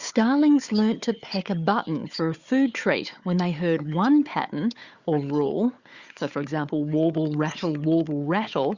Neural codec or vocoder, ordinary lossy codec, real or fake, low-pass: codec, 16 kHz, 4 kbps, FunCodec, trained on Chinese and English, 50 frames a second; Opus, 64 kbps; fake; 7.2 kHz